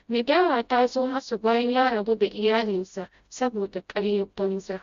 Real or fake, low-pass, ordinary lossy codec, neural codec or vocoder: fake; 7.2 kHz; Opus, 64 kbps; codec, 16 kHz, 0.5 kbps, FreqCodec, smaller model